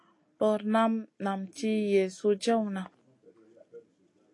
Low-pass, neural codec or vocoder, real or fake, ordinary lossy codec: 10.8 kHz; none; real; AAC, 48 kbps